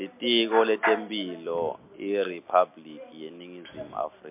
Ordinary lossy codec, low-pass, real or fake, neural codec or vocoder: MP3, 32 kbps; 3.6 kHz; real; none